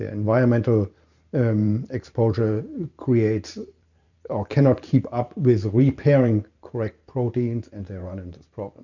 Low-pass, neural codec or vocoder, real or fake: 7.2 kHz; vocoder, 44.1 kHz, 128 mel bands every 512 samples, BigVGAN v2; fake